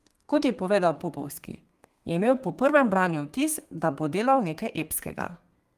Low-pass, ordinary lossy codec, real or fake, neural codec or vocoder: 14.4 kHz; Opus, 32 kbps; fake; codec, 32 kHz, 1.9 kbps, SNAC